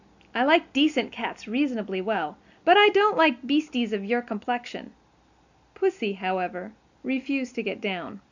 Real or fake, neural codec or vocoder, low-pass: real; none; 7.2 kHz